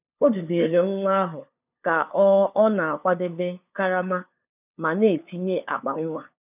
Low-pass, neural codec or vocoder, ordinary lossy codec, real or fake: 3.6 kHz; codec, 16 kHz, 2 kbps, FunCodec, trained on LibriTTS, 25 frames a second; none; fake